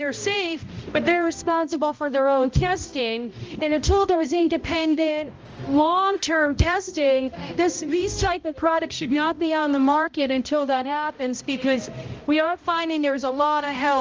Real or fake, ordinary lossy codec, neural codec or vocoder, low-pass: fake; Opus, 24 kbps; codec, 16 kHz, 0.5 kbps, X-Codec, HuBERT features, trained on balanced general audio; 7.2 kHz